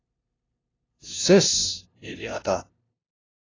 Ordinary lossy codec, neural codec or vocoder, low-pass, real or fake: AAC, 32 kbps; codec, 16 kHz, 0.5 kbps, FunCodec, trained on LibriTTS, 25 frames a second; 7.2 kHz; fake